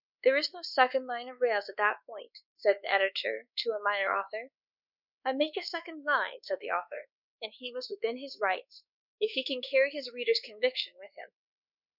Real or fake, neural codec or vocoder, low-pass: fake; codec, 24 kHz, 1.2 kbps, DualCodec; 5.4 kHz